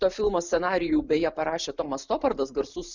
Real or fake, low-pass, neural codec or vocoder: real; 7.2 kHz; none